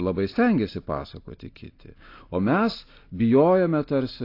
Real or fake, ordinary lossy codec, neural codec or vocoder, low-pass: real; AAC, 32 kbps; none; 5.4 kHz